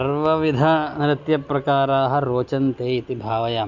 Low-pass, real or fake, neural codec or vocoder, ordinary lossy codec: 7.2 kHz; real; none; none